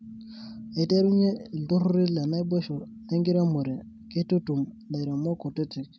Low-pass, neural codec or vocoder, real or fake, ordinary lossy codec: none; none; real; none